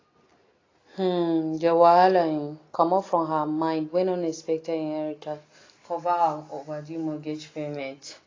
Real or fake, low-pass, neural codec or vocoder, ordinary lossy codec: real; 7.2 kHz; none; AAC, 32 kbps